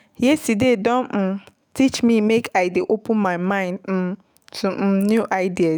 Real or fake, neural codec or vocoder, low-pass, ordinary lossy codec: fake; autoencoder, 48 kHz, 128 numbers a frame, DAC-VAE, trained on Japanese speech; none; none